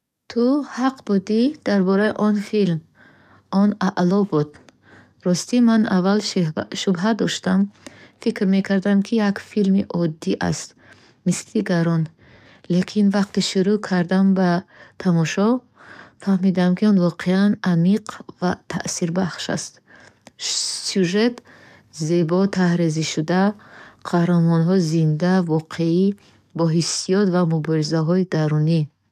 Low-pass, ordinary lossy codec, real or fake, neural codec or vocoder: 14.4 kHz; none; fake; codec, 44.1 kHz, 7.8 kbps, DAC